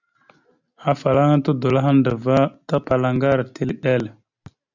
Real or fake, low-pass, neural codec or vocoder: real; 7.2 kHz; none